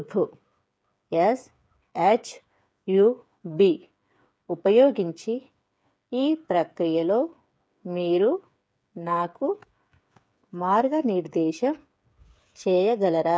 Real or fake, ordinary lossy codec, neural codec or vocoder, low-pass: fake; none; codec, 16 kHz, 8 kbps, FreqCodec, smaller model; none